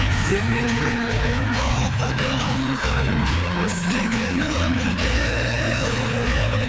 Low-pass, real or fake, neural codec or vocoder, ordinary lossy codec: none; fake; codec, 16 kHz, 2 kbps, FreqCodec, larger model; none